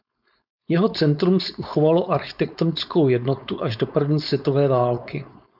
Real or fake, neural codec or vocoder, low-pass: fake; codec, 16 kHz, 4.8 kbps, FACodec; 5.4 kHz